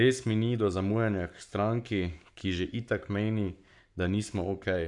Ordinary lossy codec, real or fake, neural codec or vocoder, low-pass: none; fake; codec, 44.1 kHz, 7.8 kbps, Pupu-Codec; 10.8 kHz